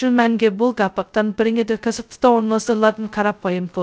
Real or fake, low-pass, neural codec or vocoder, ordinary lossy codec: fake; none; codec, 16 kHz, 0.2 kbps, FocalCodec; none